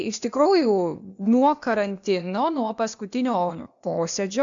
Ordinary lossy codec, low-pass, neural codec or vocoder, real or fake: MP3, 64 kbps; 7.2 kHz; codec, 16 kHz, 0.8 kbps, ZipCodec; fake